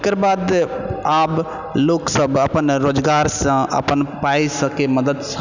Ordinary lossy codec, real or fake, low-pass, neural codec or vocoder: none; real; 7.2 kHz; none